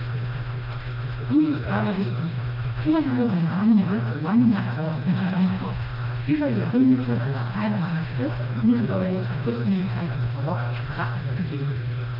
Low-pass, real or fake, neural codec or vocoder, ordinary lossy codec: 5.4 kHz; fake; codec, 16 kHz, 1 kbps, FreqCodec, smaller model; MP3, 48 kbps